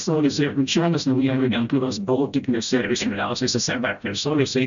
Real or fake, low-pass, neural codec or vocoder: fake; 7.2 kHz; codec, 16 kHz, 0.5 kbps, FreqCodec, smaller model